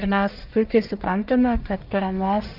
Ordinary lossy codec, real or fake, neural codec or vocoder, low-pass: Opus, 24 kbps; fake; codec, 44.1 kHz, 1.7 kbps, Pupu-Codec; 5.4 kHz